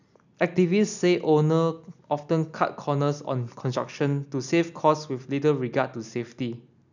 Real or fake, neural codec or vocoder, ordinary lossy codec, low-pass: real; none; none; 7.2 kHz